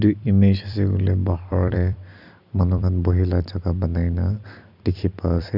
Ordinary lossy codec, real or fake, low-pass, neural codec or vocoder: none; fake; 5.4 kHz; vocoder, 44.1 kHz, 128 mel bands every 256 samples, BigVGAN v2